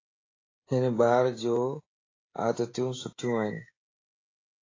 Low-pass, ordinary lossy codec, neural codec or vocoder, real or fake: 7.2 kHz; AAC, 32 kbps; codec, 16 kHz, 16 kbps, FreqCodec, smaller model; fake